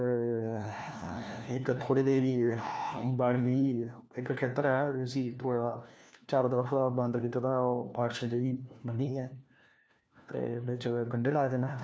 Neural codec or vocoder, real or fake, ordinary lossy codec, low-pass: codec, 16 kHz, 1 kbps, FunCodec, trained on LibriTTS, 50 frames a second; fake; none; none